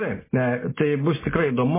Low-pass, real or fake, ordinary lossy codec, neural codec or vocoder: 3.6 kHz; real; MP3, 16 kbps; none